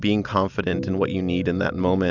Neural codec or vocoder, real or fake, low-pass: none; real; 7.2 kHz